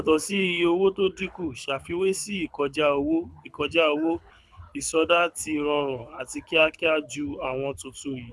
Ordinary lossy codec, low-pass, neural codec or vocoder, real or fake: none; none; codec, 24 kHz, 6 kbps, HILCodec; fake